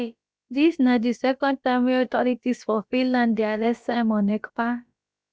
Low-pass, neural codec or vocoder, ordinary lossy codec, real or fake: none; codec, 16 kHz, about 1 kbps, DyCAST, with the encoder's durations; none; fake